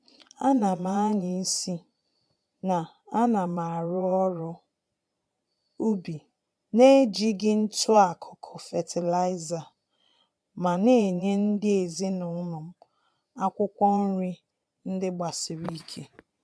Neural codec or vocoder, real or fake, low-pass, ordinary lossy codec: vocoder, 22.05 kHz, 80 mel bands, Vocos; fake; none; none